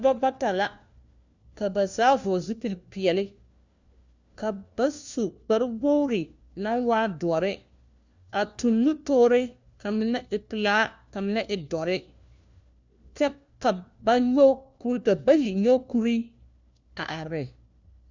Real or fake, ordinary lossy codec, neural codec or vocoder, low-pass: fake; Opus, 64 kbps; codec, 16 kHz, 1 kbps, FunCodec, trained on LibriTTS, 50 frames a second; 7.2 kHz